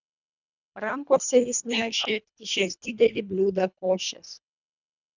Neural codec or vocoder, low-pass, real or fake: codec, 24 kHz, 1.5 kbps, HILCodec; 7.2 kHz; fake